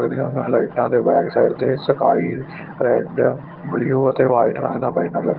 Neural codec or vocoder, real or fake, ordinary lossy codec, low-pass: vocoder, 22.05 kHz, 80 mel bands, HiFi-GAN; fake; Opus, 16 kbps; 5.4 kHz